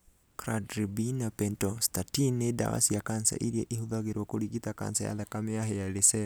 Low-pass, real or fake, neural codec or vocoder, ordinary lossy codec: none; real; none; none